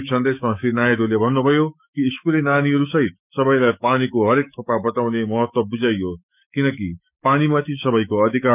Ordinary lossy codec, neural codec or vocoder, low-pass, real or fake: none; autoencoder, 48 kHz, 128 numbers a frame, DAC-VAE, trained on Japanese speech; 3.6 kHz; fake